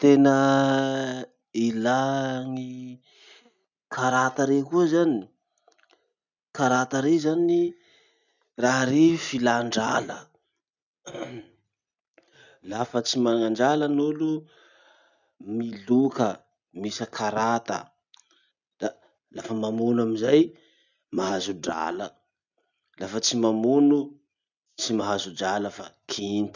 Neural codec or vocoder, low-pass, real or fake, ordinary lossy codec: none; 7.2 kHz; real; none